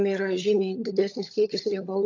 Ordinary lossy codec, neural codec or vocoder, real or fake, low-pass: AAC, 48 kbps; codec, 16 kHz, 16 kbps, FunCodec, trained on LibriTTS, 50 frames a second; fake; 7.2 kHz